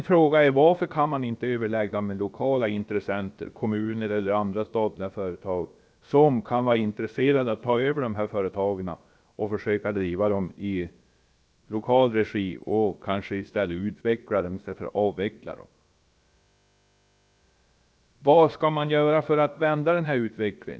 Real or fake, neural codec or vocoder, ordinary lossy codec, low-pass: fake; codec, 16 kHz, about 1 kbps, DyCAST, with the encoder's durations; none; none